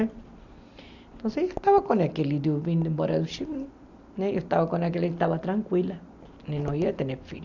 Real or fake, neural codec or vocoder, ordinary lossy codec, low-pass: real; none; Opus, 64 kbps; 7.2 kHz